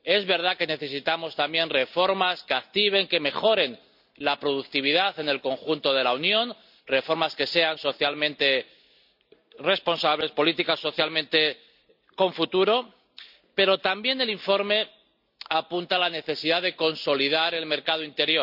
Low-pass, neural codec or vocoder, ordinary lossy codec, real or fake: 5.4 kHz; none; none; real